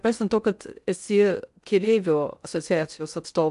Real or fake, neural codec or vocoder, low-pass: fake; codec, 16 kHz in and 24 kHz out, 0.6 kbps, FocalCodec, streaming, 2048 codes; 10.8 kHz